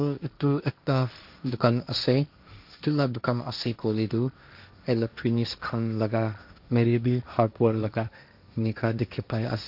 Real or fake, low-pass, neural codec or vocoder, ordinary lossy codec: fake; 5.4 kHz; codec, 16 kHz, 1.1 kbps, Voila-Tokenizer; AAC, 48 kbps